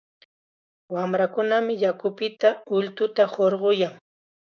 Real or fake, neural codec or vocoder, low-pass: fake; codec, 16 kHz, 6 kbps, DAC; 7.2 kHz